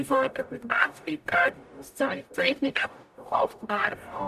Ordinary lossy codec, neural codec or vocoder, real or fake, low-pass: none; codec, 44.1 kHz, 0.9 kbps, DAC; fake; 14.4 kHz